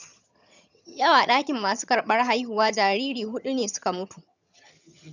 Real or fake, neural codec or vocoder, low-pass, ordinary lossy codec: fake; vocoder, 22.05 kHz, 80 mel bands, HiFi-GAN; 7.2 kHz; none